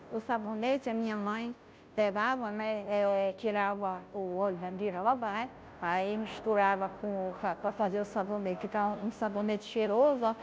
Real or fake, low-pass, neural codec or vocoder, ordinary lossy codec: fake; none; codec, 16 kHz, 0.5 kbps, FunCodec, trained on Chinese and English, 25 frames a second; none